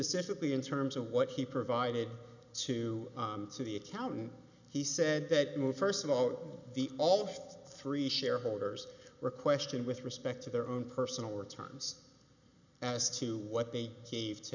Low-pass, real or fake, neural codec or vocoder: 7.2 kHz; real; none